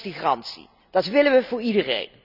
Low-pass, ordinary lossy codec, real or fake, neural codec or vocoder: 5.4 kHz; none; real; none